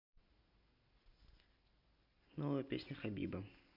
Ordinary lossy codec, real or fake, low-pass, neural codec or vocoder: MP3, 48 kbps; real; 5.4 kHz; none